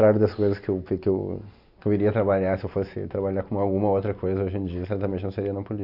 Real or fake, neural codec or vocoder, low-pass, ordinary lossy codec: real; none; 5.4 kHz; none